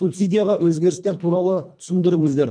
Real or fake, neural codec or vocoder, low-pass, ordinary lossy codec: fake; codec, 24 kHz, 1.5 kbps, HILCodec; 9.9 kHz; none